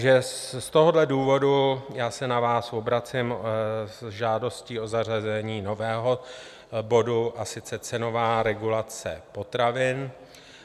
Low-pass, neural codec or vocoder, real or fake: 14.4 kHz; none; real